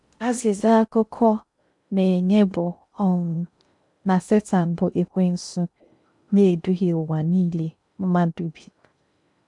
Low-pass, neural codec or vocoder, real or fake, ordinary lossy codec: 10.8 kHz; codec, 16 kHz in and 24 kHz out, 0.6 kbps, FocalCodec, streaming, 4096 codes; fake; none